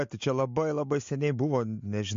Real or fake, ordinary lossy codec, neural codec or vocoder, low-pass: real; MP3, 48 kbps; none; 7.2 kHz